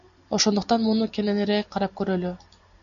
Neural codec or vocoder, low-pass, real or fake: none; 7.2 kHz; real